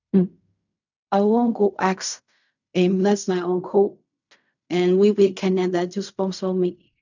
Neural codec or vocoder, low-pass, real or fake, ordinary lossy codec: codec, 16 kHz in and 24 kHz out, 0.4 kbps, LongCat-Audio-Codec, fine tuned four codebook decoder; 7.2 kHz; fake; none